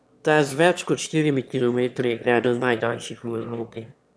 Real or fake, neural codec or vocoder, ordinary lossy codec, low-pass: fake; autoencoder, 22.05 kHz, a latent of 192 numbers a frame, VITS, trained on one speaker; none; none